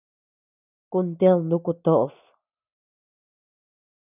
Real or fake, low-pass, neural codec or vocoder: real; 3.6 kHz; none